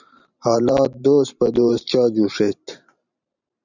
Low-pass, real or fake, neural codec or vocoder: 7.2 kHz; fake; vocoder, 44.1 kHz, 128 mel bands every 512 samples, BigVGAN v2